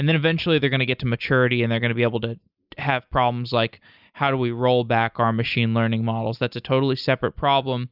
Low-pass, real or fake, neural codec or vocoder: 5.4 kHz; real; none